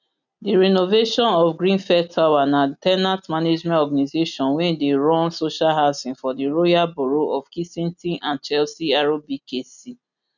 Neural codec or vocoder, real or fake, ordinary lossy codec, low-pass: none; real; none; 7.2 kHz